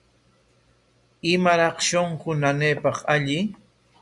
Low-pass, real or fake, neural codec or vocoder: 10.8 kHz; real; none